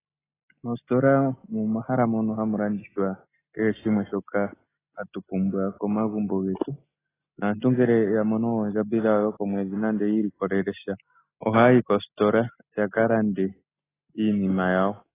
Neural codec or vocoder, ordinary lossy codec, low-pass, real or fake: none; AAC, 16 kbps; 3.6 kHz; real